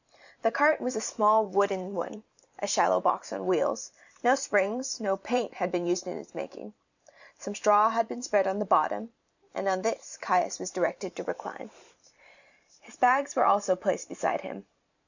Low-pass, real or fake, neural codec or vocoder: 7.2 kHz; fake; vocoder, 44.1 kHz, 128 mel bands every 512 samples, BigVGAN v2